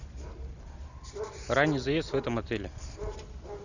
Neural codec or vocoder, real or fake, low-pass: none; real; 7.2 kHz